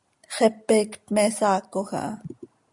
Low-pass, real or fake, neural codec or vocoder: 10.8 kHz; real; none